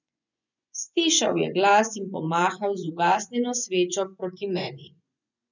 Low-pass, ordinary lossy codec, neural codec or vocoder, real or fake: 7.2 kHz; none; vocoder, 24 kHz, 100 mel bands, Vocos; fake